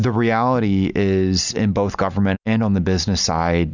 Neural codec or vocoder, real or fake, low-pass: none; real; 7.2 kHz